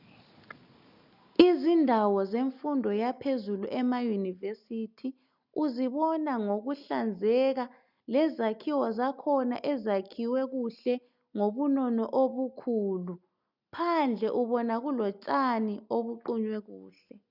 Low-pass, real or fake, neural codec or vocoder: 5.4 kHz; real; none